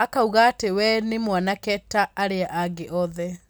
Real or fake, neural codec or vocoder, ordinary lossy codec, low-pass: real; none; none; none